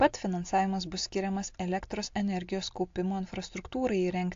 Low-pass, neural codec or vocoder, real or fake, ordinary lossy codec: 7.2 kHz; none; real; AAC, 48 kbps